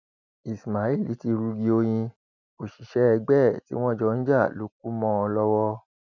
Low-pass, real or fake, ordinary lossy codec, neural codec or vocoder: 7.2 kHz; real; none; none